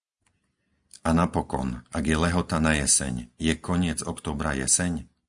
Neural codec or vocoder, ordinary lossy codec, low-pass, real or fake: none; Opus, 64 kbps; 10.8 kHz; real